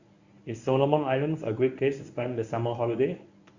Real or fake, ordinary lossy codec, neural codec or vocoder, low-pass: fake; Opus, 64 kbps; codec, 24 kHz, 0.9 kbps, WavTokenizer, medium speech release version 1; 7.2 kHz